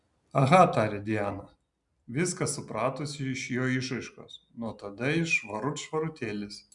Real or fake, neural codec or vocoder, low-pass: real; none; 10.8 kHz